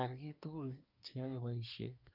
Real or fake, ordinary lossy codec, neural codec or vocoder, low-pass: fake; none; codec, 24 kHz, 1 kbps, SNAC; 5.4 kHz